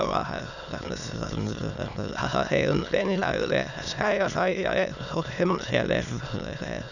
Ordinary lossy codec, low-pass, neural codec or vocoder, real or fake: none; 7.2 kHz; autoencoder, 22.05 kHz, a latent of 192 numbers a frame, VITS, trained on many speakers; fake